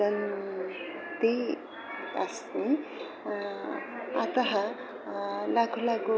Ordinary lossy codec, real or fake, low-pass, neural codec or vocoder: none; real; none; none